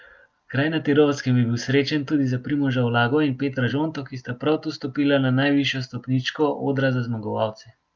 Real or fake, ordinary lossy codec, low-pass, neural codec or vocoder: real; Opus, 24 kbps; 7.2 kHz; none